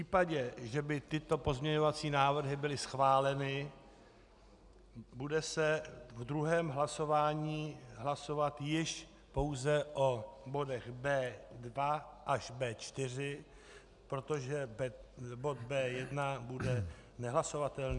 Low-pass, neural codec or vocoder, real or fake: 10.8 kHz; none; real